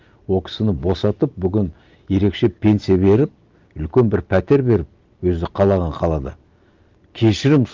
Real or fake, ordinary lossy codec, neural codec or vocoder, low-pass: real; Opus, 24 kbps; none; 7.2 kHz